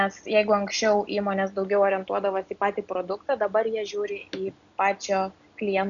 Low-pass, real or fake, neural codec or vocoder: 7.2 kHz; real; none